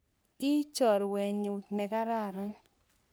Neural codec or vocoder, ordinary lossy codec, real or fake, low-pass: codec, 44.1 kHz, 3.4 kbps, Pupu-Codec; none; fake; none